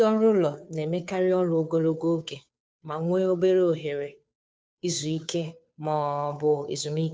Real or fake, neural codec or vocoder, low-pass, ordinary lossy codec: fake; codec, 16 kHz, 2 kbps, FunCodec, trained on Chinese and English, 25 frames a second; none; none